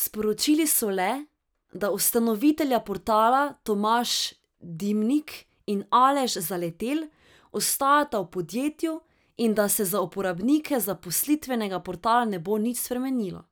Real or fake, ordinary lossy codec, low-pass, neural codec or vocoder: real; none; none; none